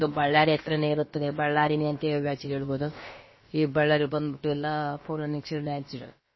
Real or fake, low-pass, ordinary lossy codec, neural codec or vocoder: fake; 7.2 kHz; MP3, 24 kbps; codec, 16 kHz, about 1 kbps, DyCAST, with the encoder's durations